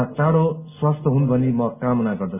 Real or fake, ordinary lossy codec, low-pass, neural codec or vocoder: real; none; 3.6 kHz; none